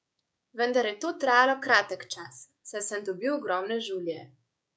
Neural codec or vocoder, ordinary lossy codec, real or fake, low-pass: codec, 16 kHz, 6 kbps, DAC; none; fake; none